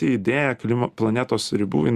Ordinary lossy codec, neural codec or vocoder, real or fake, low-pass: Opus, 64 kbps; none; real; 14.4 kHz